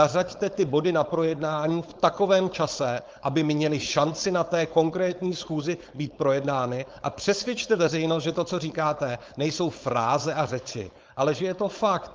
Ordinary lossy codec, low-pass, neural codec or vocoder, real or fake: Opus, 32 kbps; 7.2 kHz; codec, 16 kHz, 4.8 kbps, FACodec; fake